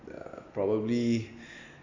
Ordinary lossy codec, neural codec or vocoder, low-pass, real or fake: AAC, 48 kbps; none; 7.2 kHz; real